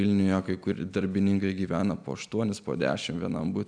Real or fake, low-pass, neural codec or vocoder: real; 9.9 kHz; none